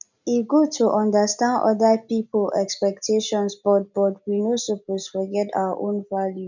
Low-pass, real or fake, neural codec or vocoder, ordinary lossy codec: 7.2 kHz; real; none; none